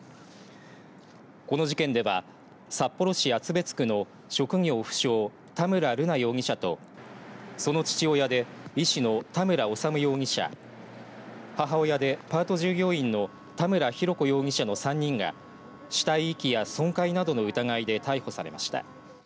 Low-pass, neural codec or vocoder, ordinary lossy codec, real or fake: none; none; none; real